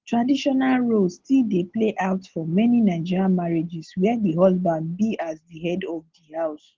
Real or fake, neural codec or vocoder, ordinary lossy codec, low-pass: real; none; Opus, 16 kbps; 7.2 kHz